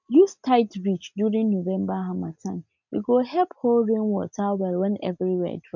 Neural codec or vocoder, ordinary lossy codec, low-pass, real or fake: none; none; 7.2 kHz; real